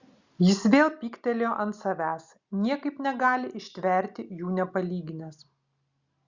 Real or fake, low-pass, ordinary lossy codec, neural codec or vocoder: real; 7.2 kHz; Opus, 64 kbps; none